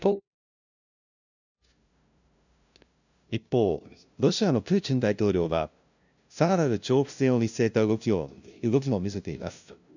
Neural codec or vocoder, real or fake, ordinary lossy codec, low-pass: codec, 16 kHz, 0.5 kbps, FunCodec, trained on LibriTTS, 25 frames a second; fake; none; 7.2 kHz